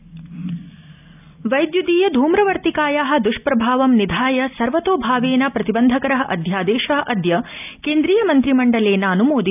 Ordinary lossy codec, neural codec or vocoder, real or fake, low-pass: none; none; real; 3.6 kHz